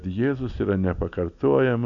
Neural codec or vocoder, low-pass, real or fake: none; 7.2 kHz; real